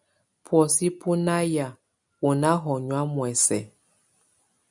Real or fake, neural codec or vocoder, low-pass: real; none; 10.8 kHz